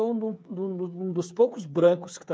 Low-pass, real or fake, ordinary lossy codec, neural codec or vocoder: none; fake; none; codec, 16 kHz, 8 kbps, FreqCodec, smaller model